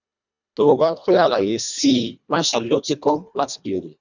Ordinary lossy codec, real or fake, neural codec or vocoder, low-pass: none; fake; codec, 24 kHz, 1.5 kbps, HILCodec; 7.2 kHz